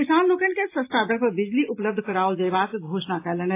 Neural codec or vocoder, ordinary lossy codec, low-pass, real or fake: none; AAC, 24 kbps; 3.6 kHz; real